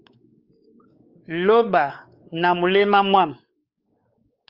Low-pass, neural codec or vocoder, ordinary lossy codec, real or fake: 5.4 kHz; codec, 16 kHz, 4 kbps, X-Codec, WavLM features, trained on Multilingual LibriSpeech; Opus, 24 kbps; fake